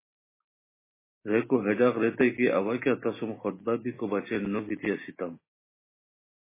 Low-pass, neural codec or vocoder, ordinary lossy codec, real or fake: 3.6 kHz; none; MP3, 16 kbps; real